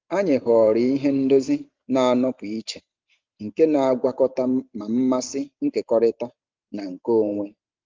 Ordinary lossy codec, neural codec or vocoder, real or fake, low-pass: Opus, 24 kbps; vocoder, 44.1 kHz, 128 mel bands every 512 samples, BigVGAN v2; fake; 7.2 kHz